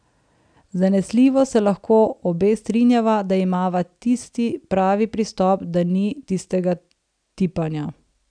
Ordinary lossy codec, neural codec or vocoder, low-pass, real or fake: none; none; 9.9 kHz; real